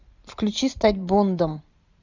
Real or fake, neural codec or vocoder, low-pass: fake; vocoder, 44.1 kHz, 128 mel bands every 512 samples, BigVGAN v2; 7.2 kHz